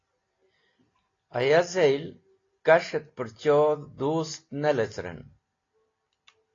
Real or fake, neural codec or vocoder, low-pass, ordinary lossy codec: real; none; 7.2 kHz; AAC, 32 kbps